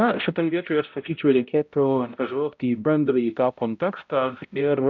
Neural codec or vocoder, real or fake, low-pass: codec, 16 kHz, 0.5 kbps, X-Codec, HuBERT features, trained on balanced general audio; fake; 7.2 kHz